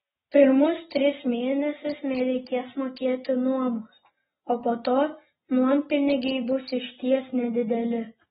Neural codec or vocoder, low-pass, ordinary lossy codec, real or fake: none; 10.8 kHz; AAC, 16 kbps; real